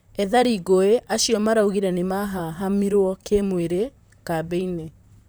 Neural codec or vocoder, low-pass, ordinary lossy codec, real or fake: vocoder, 44.1 kHz, 128 mel bands, Pupu-Vocoder; none; none; fake